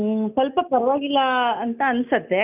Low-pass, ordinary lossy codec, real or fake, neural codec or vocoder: 3.6 kHz; none; real; none